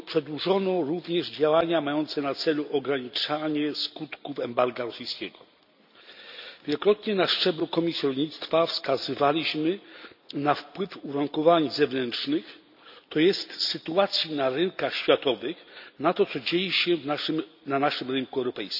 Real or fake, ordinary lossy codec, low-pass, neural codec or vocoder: real; none; 5.4 kHz; none